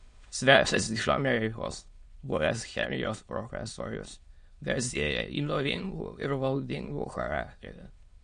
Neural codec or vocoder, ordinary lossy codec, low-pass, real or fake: autoencoder, 22.05 kHz, a latent of 192 numbers a frame, VITS, trained on many speakers; MP3, 48 kbps; 9.9 kHz; fake